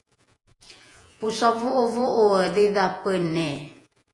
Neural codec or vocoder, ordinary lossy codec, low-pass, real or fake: vocoder, 48 kHz, 128 mel bands, Vocos; AAC, 48 kbps; 10.8 kHz; fake